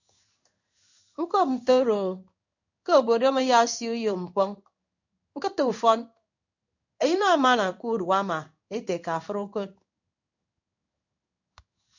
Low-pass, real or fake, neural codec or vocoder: 7.2 kHz; fake; codec, 16 kHz in and 24 kHz out, 1 kbps, XY-Tokenizer